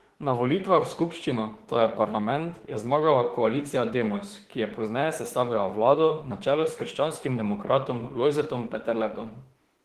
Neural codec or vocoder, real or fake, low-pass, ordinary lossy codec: autoencoder, 48 kHz, 32 numbers a frame, DAC-VAE, trained on Japanese speech; fake; 14.4 kHz; Opus, 16 kbps